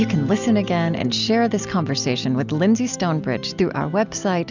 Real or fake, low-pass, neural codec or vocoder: real; 7.2 kHz; none